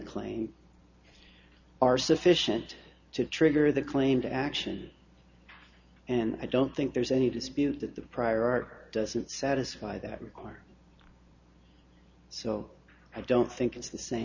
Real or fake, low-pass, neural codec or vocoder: real; 7.2 kHz; none